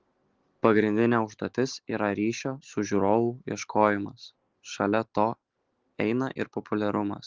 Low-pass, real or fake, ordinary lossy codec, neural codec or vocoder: 7.2 kHz; real; Opus, 16 kbps; none